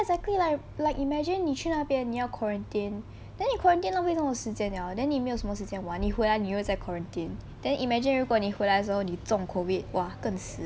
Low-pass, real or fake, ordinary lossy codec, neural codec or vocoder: none; real; none; none